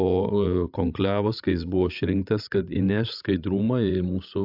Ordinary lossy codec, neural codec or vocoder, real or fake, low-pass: AAC, 48 kbps; codec, 16 kHz, 16 kbps, FreqCodec, larger model; fake; 5.4 kHz